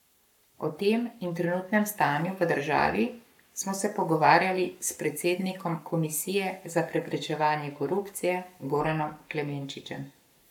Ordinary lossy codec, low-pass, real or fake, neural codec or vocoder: none; 19.8 kHz; fake; codec, 44.1 kHz, 7.8 kbps, Pupu-Codec